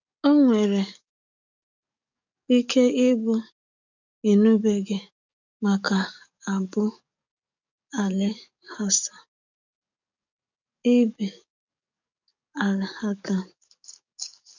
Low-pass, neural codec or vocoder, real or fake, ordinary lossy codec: 7.2 kHz; codec, 44.1 kHz, 7.8 kbps, DAC; fake; none